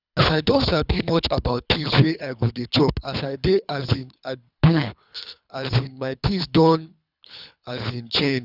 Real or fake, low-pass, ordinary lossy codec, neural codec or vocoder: fake; 5.4 kHz; none; codec, 24 kHz, 3 kbps, HILCodec